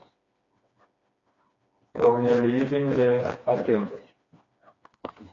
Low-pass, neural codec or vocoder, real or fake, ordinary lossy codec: 7.2 kHz; codec, 16 kHz, 2 kbps, FreqCodec, smaller model; fake; AAC, 32 kbps